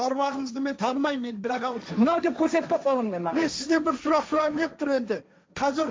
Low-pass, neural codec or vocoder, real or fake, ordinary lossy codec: none; codec, 16 kHz, 1.1 kbps, Voila-Tokenizer; fake; none